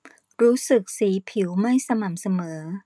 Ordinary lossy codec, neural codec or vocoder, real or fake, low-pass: none; none; real; none